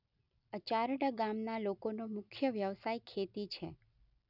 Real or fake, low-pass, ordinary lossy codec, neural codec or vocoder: real; 5.4 kHz; none; none